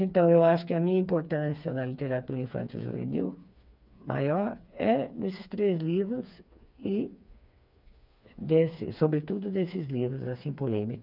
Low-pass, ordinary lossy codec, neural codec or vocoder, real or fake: 5.4 kHz; none; codec, 16 kHz, 4 kbps, FreqCodec, smaller model; fake